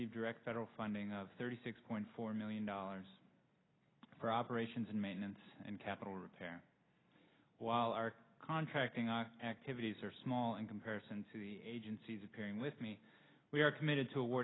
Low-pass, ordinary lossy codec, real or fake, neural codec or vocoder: 7.2 kHz; AAC, 16 kbps; real; none